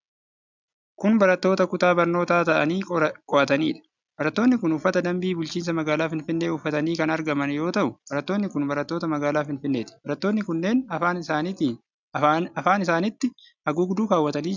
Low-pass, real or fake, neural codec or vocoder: 7.2 kHz; real; none